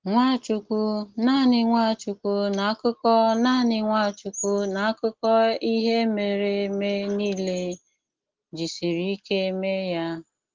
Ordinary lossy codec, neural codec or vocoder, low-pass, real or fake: Opus, 16 kbps; none; 7.2 kHz; real